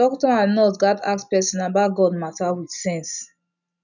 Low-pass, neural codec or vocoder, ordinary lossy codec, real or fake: 7.2 kHz; none; none; real